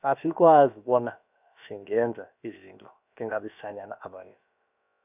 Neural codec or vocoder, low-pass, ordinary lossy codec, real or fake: codec, 16 kHz, about 1 kbps, DyCAST, with the encoder's durations; 3.6 kHz; none; fake